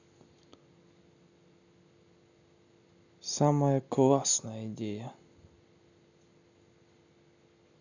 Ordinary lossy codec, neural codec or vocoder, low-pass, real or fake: none; none; 7.2 kHz; real